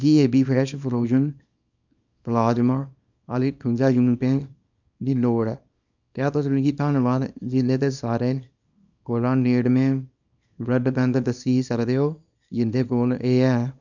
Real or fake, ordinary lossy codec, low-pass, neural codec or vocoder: fake; none; 7.2 kHz; codec, 24 kHz, 0.9 kbps, WavTokenizer, small release